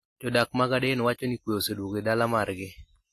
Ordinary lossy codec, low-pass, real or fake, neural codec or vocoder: AAC, 48 kbps; 14.4 kHz; real; none